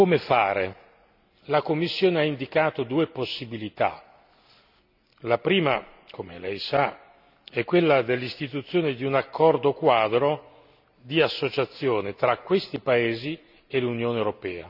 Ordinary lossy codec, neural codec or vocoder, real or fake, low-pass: none; none; real; 5.4 kHz